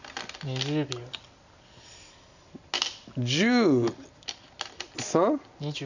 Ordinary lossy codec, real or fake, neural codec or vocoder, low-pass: none; real; none; 7.2 kHz